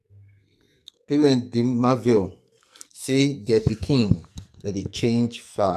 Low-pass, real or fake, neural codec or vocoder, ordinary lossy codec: 14.4 kHz; fake; codec, 44.1 kHz, 2.6 kbps, SNAC; none